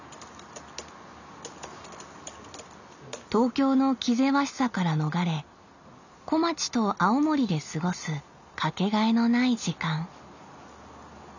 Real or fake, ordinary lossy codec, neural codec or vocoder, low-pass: real; none; none; 7.2 kHz